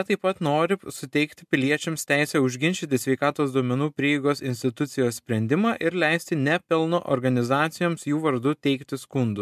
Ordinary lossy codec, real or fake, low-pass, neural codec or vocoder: MP3, 96 kbps; real; 14.4 kHz; none